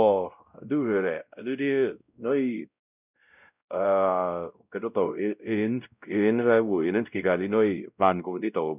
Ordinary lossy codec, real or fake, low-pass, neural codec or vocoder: none; fake; 3.6 kHz; codec, 16 kHz, 0.5 kbps, X-Codec, WavLM features, trained on Multilingual LibriSpeech